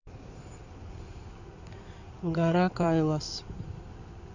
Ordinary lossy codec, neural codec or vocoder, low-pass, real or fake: none; codec, 16 kHz in and 24 kHz out, 2.2 kbps, FireRedTTS-2 codec; 7.2 kHz; fake